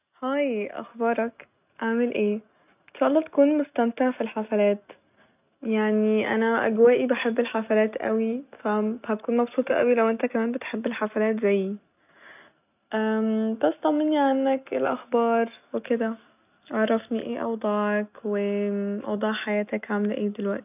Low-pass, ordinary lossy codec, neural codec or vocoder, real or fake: 3.6 kHz; none; none; real